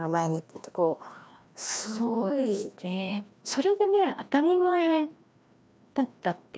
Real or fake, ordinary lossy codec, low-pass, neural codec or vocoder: fake; none; none; codec, 16 kHz, 1 kbps, FreqCodec, larger model